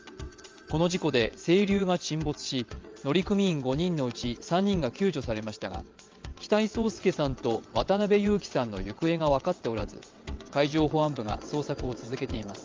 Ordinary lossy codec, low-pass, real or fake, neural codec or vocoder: Opus, 32 kbps; 7.2 kHz; fake; vocoder, 22.05 kHz, 80 mel bands, WaveNeXt